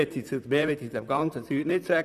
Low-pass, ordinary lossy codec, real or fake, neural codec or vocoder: 14.4 kHz; none; fake; vocoder, 44.1 kHz, 128 mel bands, Pupu-Vocoder